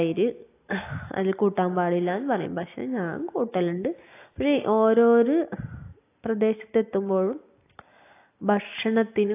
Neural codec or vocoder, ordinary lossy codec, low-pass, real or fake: none; AAC, 24 kbps; 3.6 kHz; real